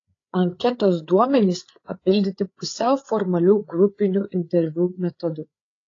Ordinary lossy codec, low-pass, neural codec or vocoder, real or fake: AAC, 32 kbps; 7.2 kHz; codec, 16 kHz, 8 kbps, FreqCodec, larger model; fake